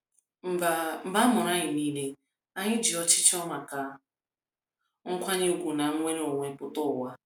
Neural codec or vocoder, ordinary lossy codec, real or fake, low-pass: none; none; real; none